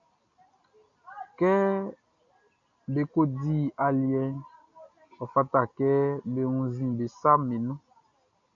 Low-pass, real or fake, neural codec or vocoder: 7.2 kHz; real; none